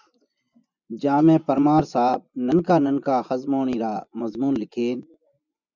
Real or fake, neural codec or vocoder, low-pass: fake; vocoder, 24 kHz, 100 mel bands, Vocos; 7.2 kHz